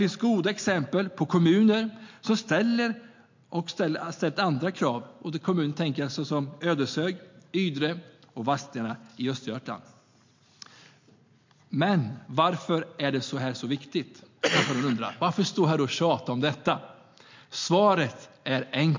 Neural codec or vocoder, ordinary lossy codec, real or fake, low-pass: none; MP3, 48 kbps; real; 7.2 kHz